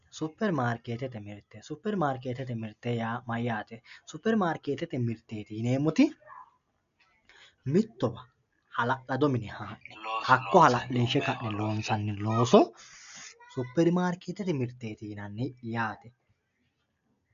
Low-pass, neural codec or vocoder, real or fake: 7.2 kHz; none; real